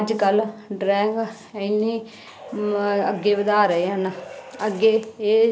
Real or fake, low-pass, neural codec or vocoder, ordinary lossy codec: real; none; none; none